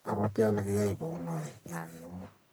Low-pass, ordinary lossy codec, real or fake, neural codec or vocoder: none; none; fake; codec, 44.1 kHz, 1.7 kbps, Pupu-Codec